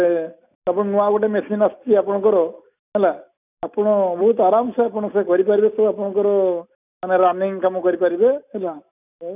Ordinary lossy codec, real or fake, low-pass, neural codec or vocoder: none; real; 3.6 kHz; none